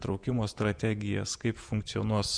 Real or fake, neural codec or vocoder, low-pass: fake; vocoder, 48 kHz, 128 mel bands, Vocos; 9.9 kHz